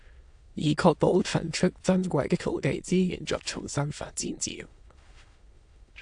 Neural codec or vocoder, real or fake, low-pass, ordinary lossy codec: autoencoder, 22.05 kHz, a latent of 192 numbers a frame, VITS, trained on many speakers; fake; 9.9 kHz; AAC, 64 kbps